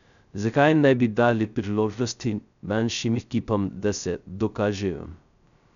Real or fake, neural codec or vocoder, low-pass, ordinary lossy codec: fake; codec, 16 kHz, 0.2 kbps, FocalCodec; 7.2 kHz; none